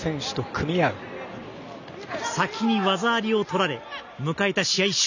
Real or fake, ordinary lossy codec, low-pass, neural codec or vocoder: real; none; 7.2 kHz; none